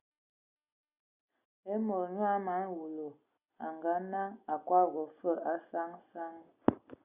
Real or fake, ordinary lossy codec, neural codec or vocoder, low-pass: real; Opus, 64 kbps; none; 3.6 kHz